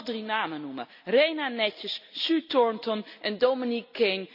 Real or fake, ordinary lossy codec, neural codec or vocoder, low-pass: real; none; none; 5.4 kHz